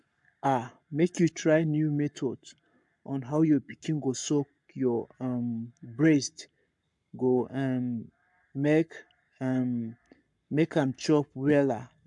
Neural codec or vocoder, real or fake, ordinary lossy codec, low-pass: codec, 44.1 kHz, 7.8 kbps, Pupu-Codec; fake; MP3, 64 kbps; 10.8 kHz